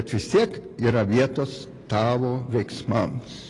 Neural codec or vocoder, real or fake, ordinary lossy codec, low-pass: none; real; AAC, 32 kbps; 10.8 kHz